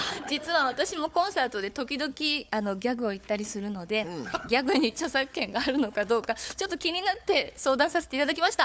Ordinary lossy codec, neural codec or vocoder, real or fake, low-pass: none; codec, 16 kHz, 4 kbps, FunCodec, trained on Chinese and English, 50 frames a second; fake; none